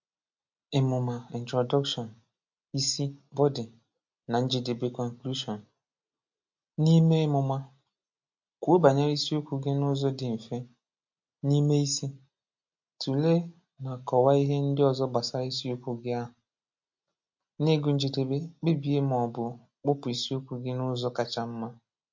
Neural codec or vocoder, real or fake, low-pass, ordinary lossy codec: none; real; 7.2 kHz; MP3, 48 kbps